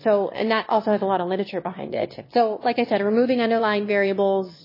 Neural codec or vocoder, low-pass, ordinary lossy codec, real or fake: autoencoder, 22.05 kHz, a latent of 192 numbers a frame, VITS, trained on one speaker; 5.4 kHz; MP3, 24 kbps; fake